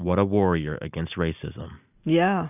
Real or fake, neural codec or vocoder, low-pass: real; none; 3.6 kHz